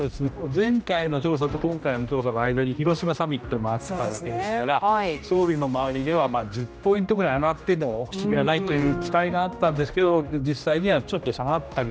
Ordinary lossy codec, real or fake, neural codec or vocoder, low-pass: none; fake; codec, 16 kHz, 1 kbps, X-Codec, HuBERT features, trained on general audio; none